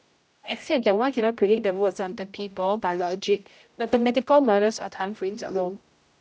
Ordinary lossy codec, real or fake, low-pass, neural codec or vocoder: none; fake; none; codec, 16 kHz, 0.5 kbps, X-Codec, HuBERT features, trained on general audio